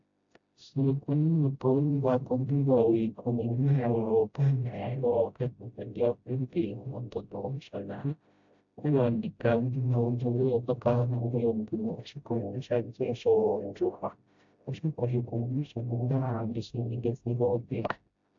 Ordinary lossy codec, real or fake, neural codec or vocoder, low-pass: AAC, 64 kbps; fake; codec, 16 kHz, 0.5 kbps, FreqCodec, smaller model; 7.2 kHz